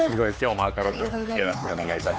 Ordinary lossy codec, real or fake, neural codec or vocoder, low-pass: none; fake; codec, 16 kHz, 4 kbps, X-Codec, HuBERT features, trained on LibriSpeech; none